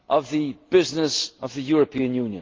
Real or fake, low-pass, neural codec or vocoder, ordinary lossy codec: real; 7.2 kHz; none; Opus, 16 kbps